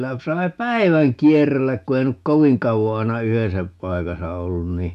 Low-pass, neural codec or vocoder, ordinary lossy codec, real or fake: 14.4 kHz; autoencoder, 48 kHz, 128 numbers a frame, DAC-VAE, trained on Japanese speech; none; fake